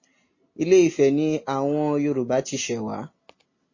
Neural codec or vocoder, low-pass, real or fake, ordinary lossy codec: none; 7.2 kHz; real; MP3, 32 kbps